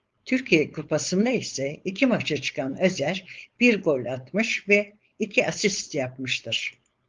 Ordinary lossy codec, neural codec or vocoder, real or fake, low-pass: Opus, 32 kbps; codec, 16 kHz, 4.8 kbps, FACodec; fake; 7.2 kHz